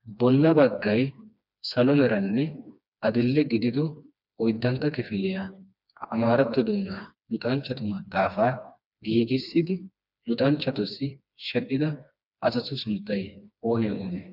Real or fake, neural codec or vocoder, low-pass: fake; codec, 16 kHz, 2 kbps, FreqCodec, smaller model; 5.4 kHz